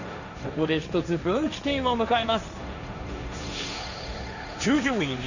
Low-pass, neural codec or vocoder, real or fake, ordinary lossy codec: 7.2 kHz; codec, 16 kHz, 1.1 kbps, Voila-Tokenizer; fake; none